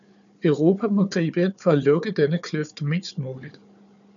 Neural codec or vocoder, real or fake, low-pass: codec, 16 kHz, 4 kbps, FunCodec, trained on Chinese and English, 50 frames a second; fake; 7.2 kHz